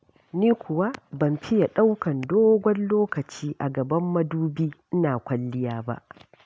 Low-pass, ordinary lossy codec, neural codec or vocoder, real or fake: none; none; none; real